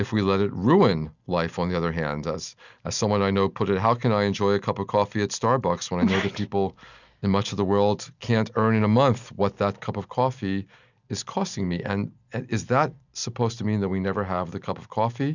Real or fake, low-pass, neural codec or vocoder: real; 7.2 kHz; none